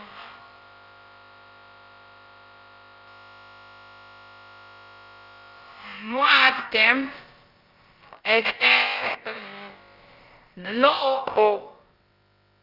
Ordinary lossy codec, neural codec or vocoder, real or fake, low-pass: Opus, 24 kbps; codec, 16 kHz, about 1 kbps, DyCAST, with the encoder's durations; fake; 5.4 kHz